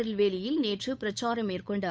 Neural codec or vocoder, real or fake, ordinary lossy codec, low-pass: codec, 16 kHz, 8 kbps, FunCodec, trained on Chinese and English, 25 frames a second; fake; none; none